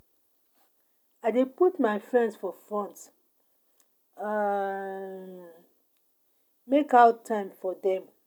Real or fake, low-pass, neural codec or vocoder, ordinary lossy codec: real; none; none; none